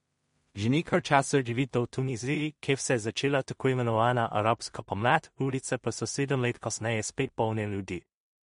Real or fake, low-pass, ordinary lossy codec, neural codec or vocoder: fake; 10.8 kHz; MP3, 48 kbps; codec, 16 kHz in and 24 kHz out, 0.4 kbps, LongCat-Audio-Codec, two codebook decoder